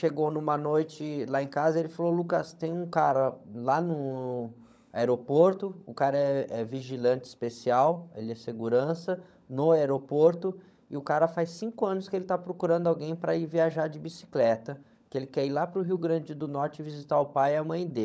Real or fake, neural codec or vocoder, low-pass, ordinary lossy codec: fake; codec, 16 kHz, 16 kbps, FunCodec, trained on LibriTTS, 50 frames a second; none; none